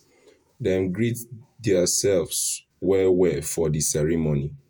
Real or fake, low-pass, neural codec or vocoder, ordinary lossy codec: fake; none; vocoder, 48 kHz, 128 mel bands, Vocos; none